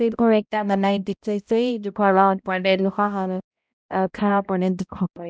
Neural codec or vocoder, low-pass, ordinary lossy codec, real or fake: codec, 16 kHz, 0.5 kbps, X-Codec, HuBERT features, trained on balanced general audio; none; none; fake